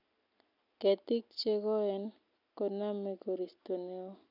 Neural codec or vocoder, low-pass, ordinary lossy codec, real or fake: none; 5.4 kHz; none; real